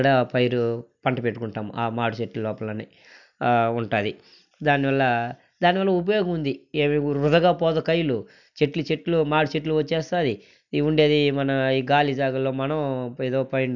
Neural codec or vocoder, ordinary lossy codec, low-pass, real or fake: none; none; 7.2 kHz; real